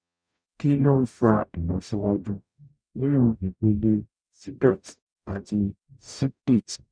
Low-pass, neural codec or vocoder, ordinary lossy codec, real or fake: 9.9 kHz; codec, 44.1 kHz, 0.9 kbps, DAC; none; fake